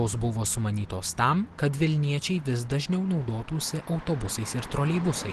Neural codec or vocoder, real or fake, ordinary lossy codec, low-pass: none; real; Opus, 16 kbps; 10.8 kHz